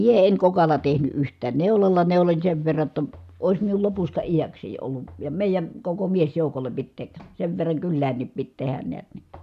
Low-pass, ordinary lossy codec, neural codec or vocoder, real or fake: 14.4 kHz; none; none; real